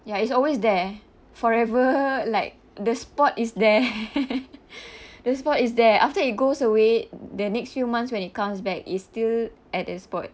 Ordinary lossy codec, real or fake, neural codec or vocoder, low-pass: none; real; none; none